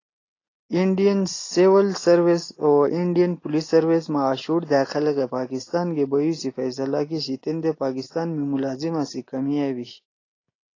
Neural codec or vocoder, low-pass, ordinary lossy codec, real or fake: none; 7.2 kHz; AAC, 32 kbps; real